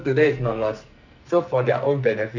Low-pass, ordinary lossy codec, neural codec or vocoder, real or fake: 7.2 kHz; none; codec, 44.1 kHz, 2.6 kbps, SNAC; fake